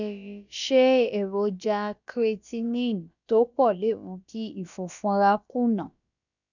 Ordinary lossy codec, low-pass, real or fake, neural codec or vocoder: none; 7.2 kHz; fake; codec, 16 kHz, about 1 kbps, DyCAST, with the encoder's durations